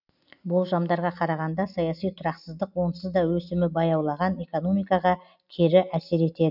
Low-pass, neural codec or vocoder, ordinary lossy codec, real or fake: 5.4 kHz; none; none; real